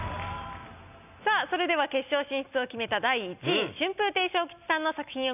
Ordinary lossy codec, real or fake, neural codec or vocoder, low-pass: none; real; none; 3.6 kHz